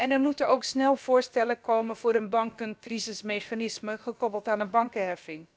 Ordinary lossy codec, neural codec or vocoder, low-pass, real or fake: none; codec, 16 kHz, about 1 kbps, DyCAST, with the encoder's durations; none; fake